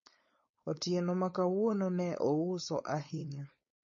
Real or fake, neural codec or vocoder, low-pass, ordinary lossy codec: fake; codec, 16 kHz, 4.8 kbps, FACodec; 7.2 kHz; MP3, 32 kbps